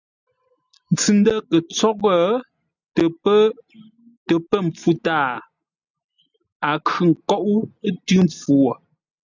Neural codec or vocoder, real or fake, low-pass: none; real; 7.2 kHz